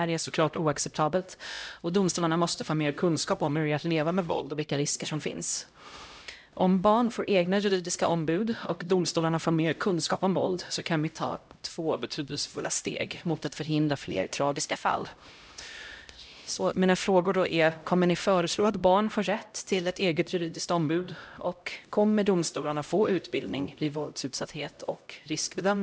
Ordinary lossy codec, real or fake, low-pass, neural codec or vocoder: none; fake; none; codec, 16 kHz, 0.5 kbps, X-Codec, HuBERT features, trained on LibriSpeech